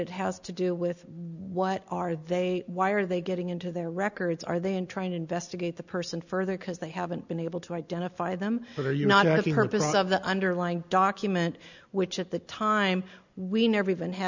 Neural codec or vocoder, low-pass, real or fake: none; 7.2 kHz; real